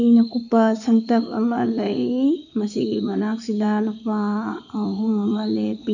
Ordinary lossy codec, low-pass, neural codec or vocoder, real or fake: none; 7.2 kHz; codec, 16 kHz in and 24 kHz out, 2.2 kbps, FireRedTTS-2 codec; fake